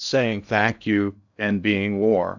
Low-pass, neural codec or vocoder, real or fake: 7.2 kHz; codec, 16 kHz in and 24 kHz out, 0.6 kbps, FocalCodec, streaming, 2048 codes; fake